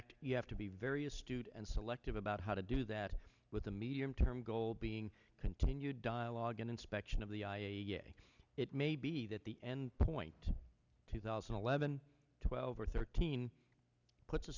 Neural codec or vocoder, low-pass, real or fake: none; 7.2 kHz; real